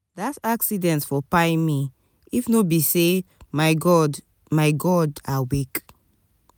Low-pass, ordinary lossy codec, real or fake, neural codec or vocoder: none; none; real; none